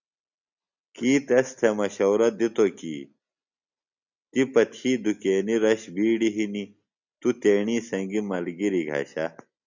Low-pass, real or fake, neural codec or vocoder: 7.2 kHz; real; none